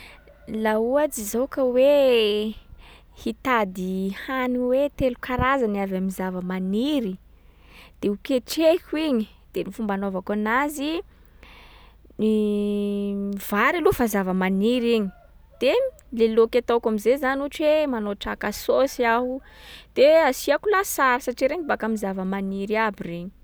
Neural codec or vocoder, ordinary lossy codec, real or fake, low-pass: none; none; real; none